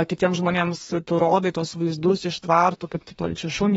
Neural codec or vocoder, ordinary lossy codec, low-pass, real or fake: codec, 32 kHz, 1.9 kbps, SNAC; AAC, 24 kbps; 14.4 kHz; fake